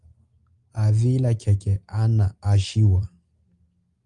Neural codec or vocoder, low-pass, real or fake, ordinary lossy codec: none; 10.8 kHz; real; Opus, 32 kbps